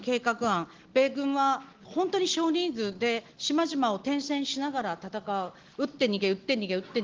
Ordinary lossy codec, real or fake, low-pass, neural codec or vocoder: Opus, 16 kbps; real; 7.2 kHz; none